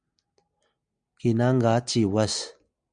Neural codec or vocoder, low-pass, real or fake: none; 9.9 kHz; real